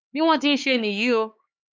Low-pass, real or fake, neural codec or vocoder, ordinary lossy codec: none; fake; codec, 16 kHz, 4 kbps, X-Codec, HuBERT features, trained on LibriSpeech; none